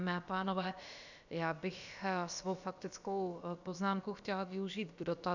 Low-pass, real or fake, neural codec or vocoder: 7.2 kHz; fake; codec, 16 kHz, about 1 kbps, DyCAST, with the encoder's durations